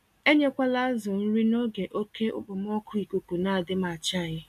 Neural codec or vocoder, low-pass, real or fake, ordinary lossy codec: none; 14.4 kHz; real; none